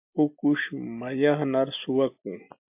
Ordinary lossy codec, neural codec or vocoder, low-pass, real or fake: MP3, 32 kbps; none; 3.6 kHz; real